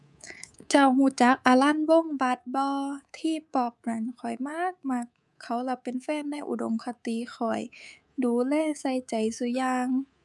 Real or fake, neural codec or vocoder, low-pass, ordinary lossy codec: fake; codec, 24 kHz, 3.1 kbps, DualCodec; none; none